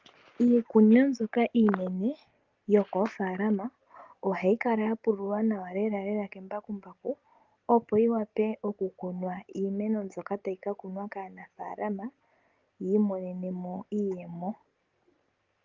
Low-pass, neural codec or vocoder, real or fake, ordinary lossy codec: 7.2 kHz; none; real; Opus, 32 kbps